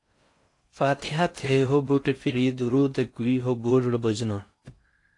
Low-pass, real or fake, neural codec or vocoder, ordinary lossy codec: 10.8 kHz; fake; codec, 16 kHz in and 24 kHz out, 0.6 kbps, FocalCodec, streaming, 4096 codes; AAC, 48 kbps